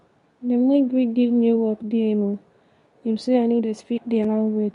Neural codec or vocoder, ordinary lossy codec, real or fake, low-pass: codec, 24 kHz, 0.9 kbps, WavTokenizer, medium speech release version 2; none; fake; 10.8 kHz